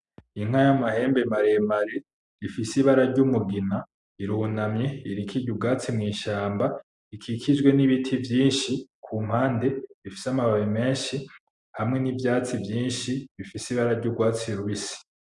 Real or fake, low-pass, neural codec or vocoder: real; 10.8 kHz; none